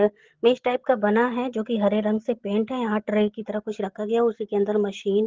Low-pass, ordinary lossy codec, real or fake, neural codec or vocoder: 7.2 kHz; Opus, 16 kbps; real; none